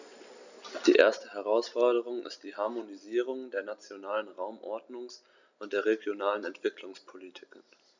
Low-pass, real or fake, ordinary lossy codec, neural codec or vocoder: 7.2 kHz; real; none; none